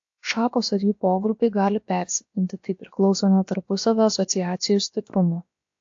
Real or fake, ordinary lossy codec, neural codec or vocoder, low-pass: fake; AAC, 48 kbps; codec, 16 kHz, about 1 kbps, DyCAST, with the encoder's durations; 7.2 kHz